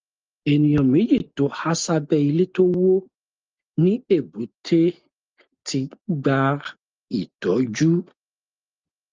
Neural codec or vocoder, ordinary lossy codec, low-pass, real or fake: none; Opus, 24 kbps; 7.2 kHz; real